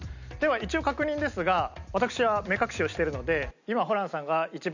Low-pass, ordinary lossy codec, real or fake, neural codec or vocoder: 7.2 kHz; none; real; none